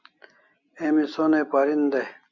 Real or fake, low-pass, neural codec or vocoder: real; 7.2 kHz; none